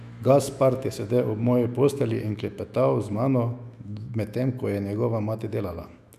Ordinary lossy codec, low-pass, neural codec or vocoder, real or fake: none; 14.4 kHz; autoencoder, 48 kHz, 128 numbers a frame, DAC-VAE, trained on Japanese speech; fake